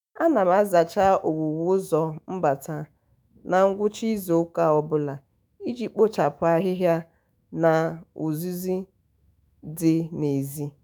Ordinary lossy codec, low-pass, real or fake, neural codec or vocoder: none; none; fake; autoencoder, 48 kHz, 128 numbers a frame, DAC-VAE, trained on Japanese speech